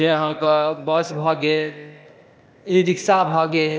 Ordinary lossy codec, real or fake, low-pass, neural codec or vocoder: none; fake; none; codec, 16 kHz, 0.8 kbps, ZipCodec